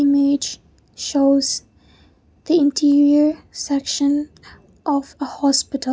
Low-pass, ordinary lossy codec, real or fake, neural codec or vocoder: none; none; real; none